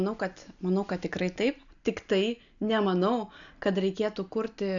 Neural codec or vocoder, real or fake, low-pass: none; real; 7.2 kHz